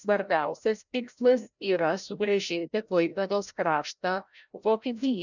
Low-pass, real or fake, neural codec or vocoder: 7.2 kHz; fake; codec, 16 kHz, 0.5 kbps, FreqCodec, larger model